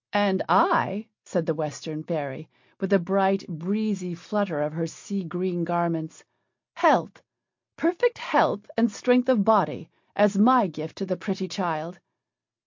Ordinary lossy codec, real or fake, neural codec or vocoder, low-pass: MP3, 48 kbps; real; none; 7.2 kHz